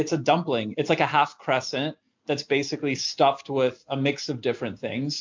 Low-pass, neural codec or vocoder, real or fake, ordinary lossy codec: 7.2 kHz; none; real; MP3, 64 kbps